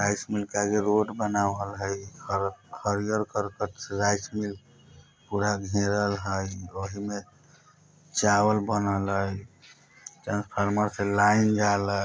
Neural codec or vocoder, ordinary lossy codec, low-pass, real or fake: none; none; none; real